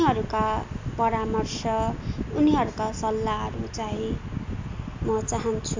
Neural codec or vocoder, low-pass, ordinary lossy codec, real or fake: none; 7.2 kHz; MP3, 64 kbps; real